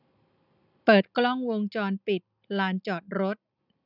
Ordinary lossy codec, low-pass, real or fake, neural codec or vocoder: none; 5.4 kHz; real; none